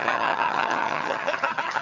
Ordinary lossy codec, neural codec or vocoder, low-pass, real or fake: none; vocoder, 22.05 kHz, 80 mel bands, HiFi-GAN; 7.2 kHz; fake